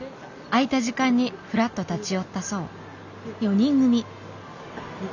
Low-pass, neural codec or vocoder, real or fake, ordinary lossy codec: 7.2 kHz; none; real; none